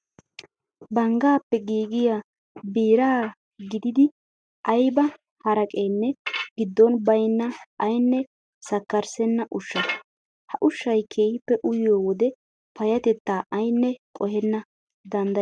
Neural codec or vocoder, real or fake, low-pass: none; real; 9.9 kHz